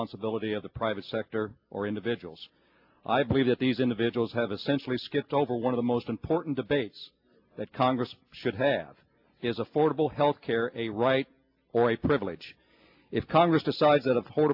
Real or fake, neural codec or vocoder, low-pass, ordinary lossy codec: real; none; 5.4 kHz; AAC, 48 kbps